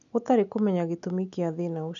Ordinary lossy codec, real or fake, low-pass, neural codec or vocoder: none; real; 7.2 kHz; none